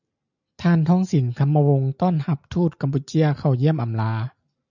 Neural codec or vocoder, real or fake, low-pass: none; real; 7.2 kHz